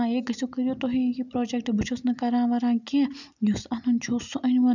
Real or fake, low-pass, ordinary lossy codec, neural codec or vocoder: real; 7.2 kHz; none; none